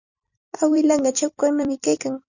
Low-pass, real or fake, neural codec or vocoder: 7.2 kHz; fake; vocoder, 44.1 kHz, 128 mel bands every 256 samples, BigVGAN v2